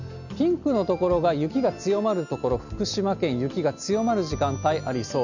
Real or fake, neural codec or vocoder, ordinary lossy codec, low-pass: real; none; none; 7.2 kHz